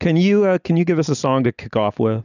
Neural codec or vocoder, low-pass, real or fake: none; 7.2 kHz; real